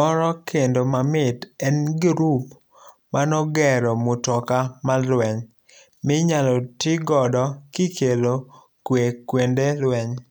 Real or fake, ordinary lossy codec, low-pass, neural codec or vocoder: real; none; none; none